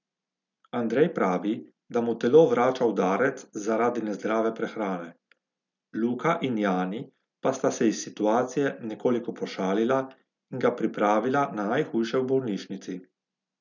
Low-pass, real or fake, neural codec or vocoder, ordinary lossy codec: 7.2 kHz; real; none; none